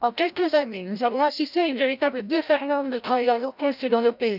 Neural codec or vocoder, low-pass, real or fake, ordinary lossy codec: codec, 16 kHz, 0.5 kbps, FreqCodec, larger model; 5.4 kHz; fake; none